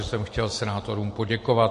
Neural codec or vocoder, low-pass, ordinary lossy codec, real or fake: none; 14.4 kHz; MP3, 48 kbps; real